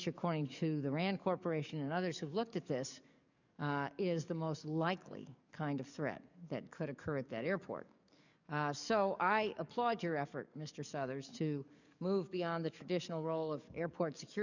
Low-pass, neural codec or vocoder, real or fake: 7.2 kHz; codec, 44.1 kHz, 7.8 kbps, DAC; fake